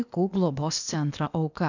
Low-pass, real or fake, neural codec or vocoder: 7.2 kHz; fake; codec, 16 kHz, 0.8 kbps, ZipCodec